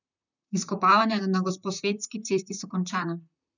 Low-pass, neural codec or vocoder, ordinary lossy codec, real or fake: 7.2 kHz; vocoder, 44.1 kHz, 128 mel bands, Pupu-Vocoder; none; fake